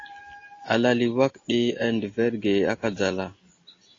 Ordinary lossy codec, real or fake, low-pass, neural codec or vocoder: AAC, 32 kbps; real; 7.2 kHz; none